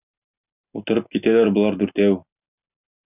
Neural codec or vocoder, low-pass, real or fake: none; 3.6 kHz; real